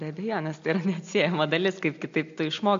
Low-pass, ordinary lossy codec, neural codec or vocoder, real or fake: 7.2 kHz; MP3, 48 kbps; none; real